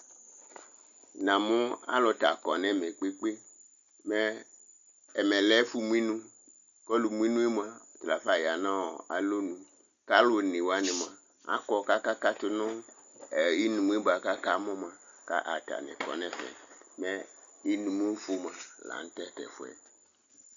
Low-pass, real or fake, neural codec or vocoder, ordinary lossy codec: 7.2 kHz; real; none; Opus, 64 kbps